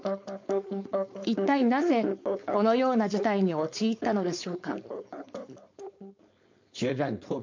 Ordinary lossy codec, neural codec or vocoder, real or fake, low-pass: MP3, 48 kbps; codec, 16 kHz, 4.8 kbps, FACodec; fake; 7.2 kHz